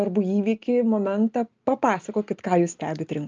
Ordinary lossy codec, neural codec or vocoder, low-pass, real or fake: Opus, 24 kbps; none; 7.2 kHz; real